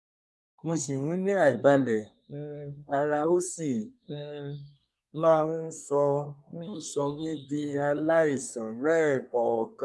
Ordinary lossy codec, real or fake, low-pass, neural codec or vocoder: none; fake; none; codec, 24 kHz, 1 kbps, SNAC